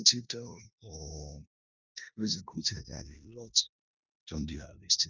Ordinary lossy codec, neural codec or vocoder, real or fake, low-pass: none; codec, 16 kHz in and 24 kHz out, 0.9 kbps, LongCat-Audio-Codec, four codebook decoder; fake; 7.2 kHz